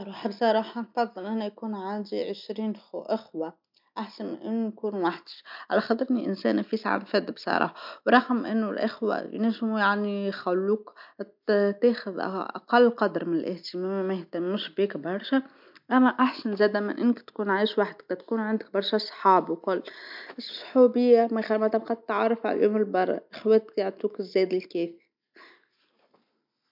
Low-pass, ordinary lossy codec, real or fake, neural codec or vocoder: 5.4 kHz; MP3, 48 kbps; real; none